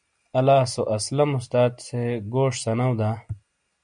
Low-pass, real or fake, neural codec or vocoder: 9.9 kHz; real; none